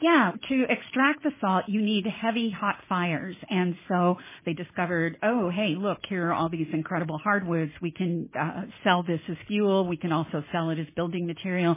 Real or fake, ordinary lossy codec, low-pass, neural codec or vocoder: real; MP3, 16 kbps; 3.6 kHz; none